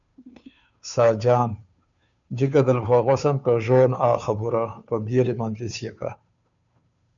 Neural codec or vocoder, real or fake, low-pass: codec, 16 kHz, 2 kbps, FunCodec, trained on Chinese and English, 25 frames a second; fake; 7.2 kHz